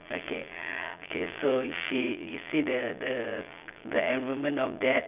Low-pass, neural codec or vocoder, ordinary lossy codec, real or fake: 3.6 kHz; vocoder, 22.05 kHz, 80 mel bands, Vocos; none; fake